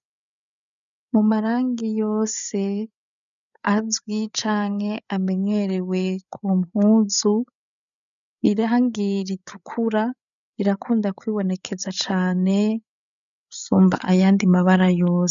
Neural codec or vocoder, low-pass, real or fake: codec, 16 kHz, 8 kbps, FreqCodec, larger model; 7.2 kHz; fake